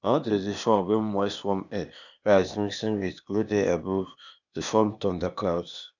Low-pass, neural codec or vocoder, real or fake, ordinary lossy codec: 7.2 kHz; codec, 16 kHz, 0.8 kbps, ZipCodec; fake; none